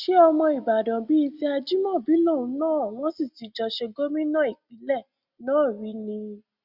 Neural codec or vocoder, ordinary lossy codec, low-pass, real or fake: none; Opus, 64 kbps; 5.4 kHz; real